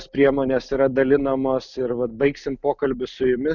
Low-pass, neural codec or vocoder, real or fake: 7.2 kHz; none; real